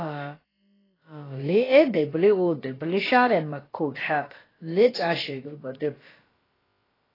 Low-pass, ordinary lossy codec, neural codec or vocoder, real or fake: 5.4 kHz; AAC, 24 kbps; codec, 16 kHz, about 1 kbps, DyCAST, with the encoder's durations; fake